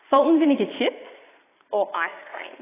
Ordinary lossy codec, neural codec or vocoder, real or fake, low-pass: AAC, 16 kbps; vocoder, 22.05 kHz, 80 mel bands, Vocos; fake; 3.6 kHz